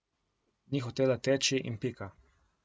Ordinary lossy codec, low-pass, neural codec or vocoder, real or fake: none; none; none; real